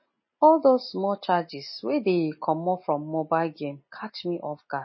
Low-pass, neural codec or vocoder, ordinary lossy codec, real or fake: 7.2 kHz; none; MP3, 24 kbps; real